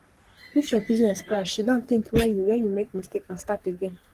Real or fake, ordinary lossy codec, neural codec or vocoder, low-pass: fake; Opus, 24 kbps; codec, 44.1 kHz, 3.4 kbps, Pupu-Codec; 14.4 kHz